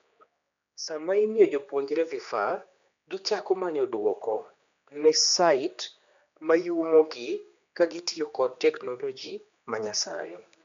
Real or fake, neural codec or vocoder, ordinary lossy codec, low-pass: fake; codec, 16 kHz, 2 kbps, X-Codec, HuBERT features, trained on general audio; MP3, 64 kbps; 7.2 kHz